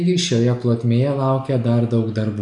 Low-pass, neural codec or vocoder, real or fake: 10.8 kHz; none; real